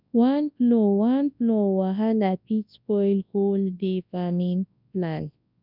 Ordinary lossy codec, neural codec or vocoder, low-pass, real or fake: none; codec, 24 kHz, 0.9 kbps, WavTokenizer, large speech release; 5.4 kHz; fake